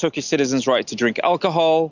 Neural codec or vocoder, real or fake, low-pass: none; real; 7.2 kHz